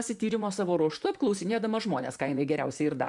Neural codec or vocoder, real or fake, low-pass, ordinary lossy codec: vocoder, 44.1 kHz, 128 mel bands, Pupu-Vocoder; fake; 10.8 kHz; MP3, 96 kbps